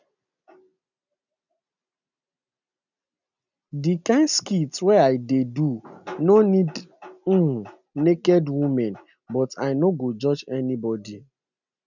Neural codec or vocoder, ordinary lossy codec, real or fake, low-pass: none; none; real; 7.2 kHz